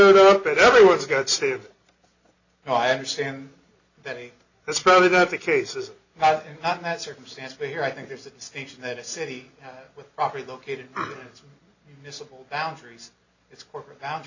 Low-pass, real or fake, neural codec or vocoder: 7.2 kHz; real; none